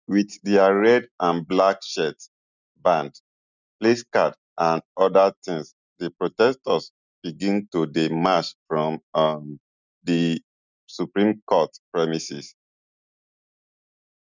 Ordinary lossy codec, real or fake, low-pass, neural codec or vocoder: none; real; 7.2 kHz; none